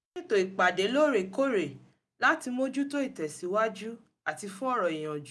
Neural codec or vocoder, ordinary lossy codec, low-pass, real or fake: none; none; none; real